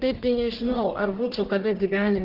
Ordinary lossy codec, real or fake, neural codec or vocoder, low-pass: Opus, 16 kbps; fake; codec, 44.1 kHz, 1.7 kbps, Pupu-Codec; 5.4 kHz